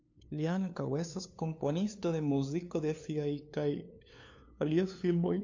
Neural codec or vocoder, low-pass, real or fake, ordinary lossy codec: codec, 16 kHz, 2 kbps, FunCodec, trained on LibriTTS, 25 frames a second; 7.2 kHz; fake; AAC, 48 kbps